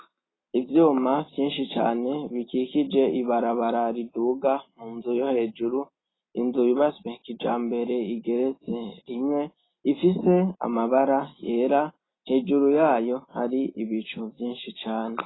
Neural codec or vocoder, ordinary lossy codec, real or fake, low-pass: none; AAC, 16 kbps; real; 7.2 kHz